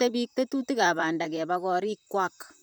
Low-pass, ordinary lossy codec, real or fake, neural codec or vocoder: none; none; fake; vocoder, 44.1 kHz, 128 mel bands, Pupu-Vocoder